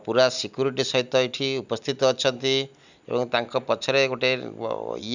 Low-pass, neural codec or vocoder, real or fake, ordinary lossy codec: 7.2 kHz; none; real; none